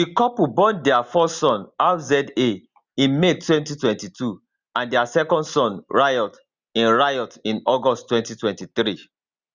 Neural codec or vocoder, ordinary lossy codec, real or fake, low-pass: none; Opus, 64 kbps; real; 7.2 kHz